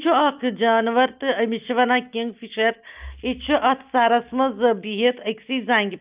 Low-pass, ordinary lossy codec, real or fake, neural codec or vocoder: 3.6 kHz; Opus, 24 kbps; real; none